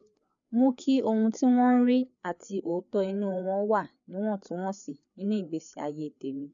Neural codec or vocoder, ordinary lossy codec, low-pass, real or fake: codec, 16 kHz, 4 kbps, FreqCodec, larger model; none; 7.2 kHz; fake